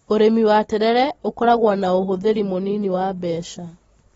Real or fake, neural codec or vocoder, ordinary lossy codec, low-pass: real; none; AAC, 24 kbps; 10.8 kHz